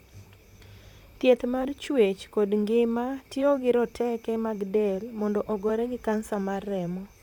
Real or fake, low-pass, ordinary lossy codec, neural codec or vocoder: fake; 19.8 kHz; none; vocoder, 44.1 kHz, 128 mel bands, Pupu-Vocoder